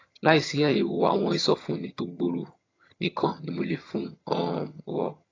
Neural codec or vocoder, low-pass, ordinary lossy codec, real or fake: vocoder, 22.05 kHz, 80 mel bands, HiFi-GAN; 7.2 kHz; AAC, 32 kbps; fake